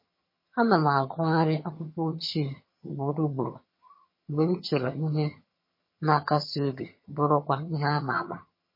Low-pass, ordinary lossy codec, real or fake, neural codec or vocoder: 5.4 kHz; MP3, 24 kbps; fake; vocoder, 22.05 kHz, 80 mel bands, HiFi-GAN